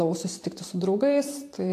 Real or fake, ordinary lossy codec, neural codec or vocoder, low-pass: fake; AAC, 64 kbps; vocoder, 44.1 kHz, 128 mel bands every 512 samples, BigVGAN v2; 14.4 kHz